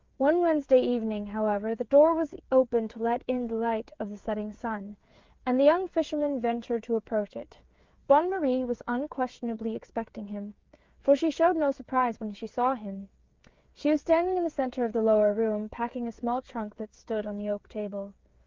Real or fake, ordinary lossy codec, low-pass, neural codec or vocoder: fake; Opus, 32 kbps; 7.2 kHz; codec, 16 kHz, 8 kbps, FreqCodec, smaller model